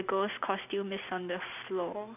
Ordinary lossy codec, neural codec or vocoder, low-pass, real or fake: none; none; 3.6 kHz; real